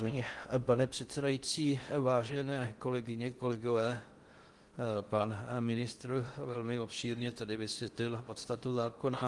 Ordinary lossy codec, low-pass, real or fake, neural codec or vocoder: Opus, 24 kbps; 10.8 kHz; fake; codec, 16 kHz in and 24 kHz out, 0.6 kbps, FocalCodec, streaming, 4096 codes